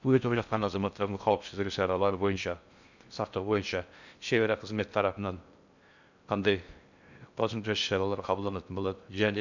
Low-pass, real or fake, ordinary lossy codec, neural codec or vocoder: 7.2 kHz; fake; none; codec, 16 kHz in and 24 kHz out, 0.6 kbps, FocalCodec, streaming, 4096 codes